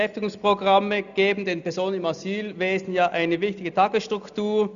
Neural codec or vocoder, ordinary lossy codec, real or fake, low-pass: none; none; real; 7.2 kHz